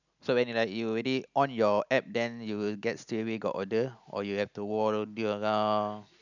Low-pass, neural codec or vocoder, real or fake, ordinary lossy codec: 7.2 kHz; none; real; none